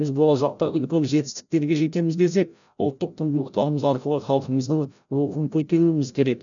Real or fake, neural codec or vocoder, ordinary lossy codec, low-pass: fake; codec, 16 kHz, 0.5 kbps, FreqCodec, larger model; none; 7.2 kHz